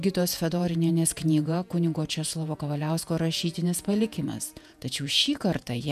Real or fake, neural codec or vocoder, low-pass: fake; vocoder, 48 kHz, 128 mel bands, Vocos; 14.4 kHz